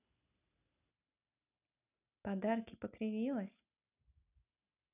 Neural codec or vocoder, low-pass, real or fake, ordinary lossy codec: none; 3.6 kHz; real; none